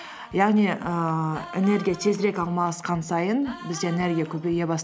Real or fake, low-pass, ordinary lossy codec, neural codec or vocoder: real; none; none; none